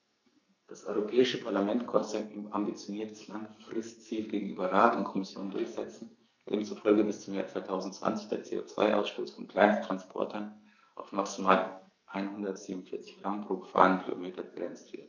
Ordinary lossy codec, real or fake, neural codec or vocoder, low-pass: none; fake; codec, 44.1 kHz, 2.6 kbps, SNAC; 7.2 kHz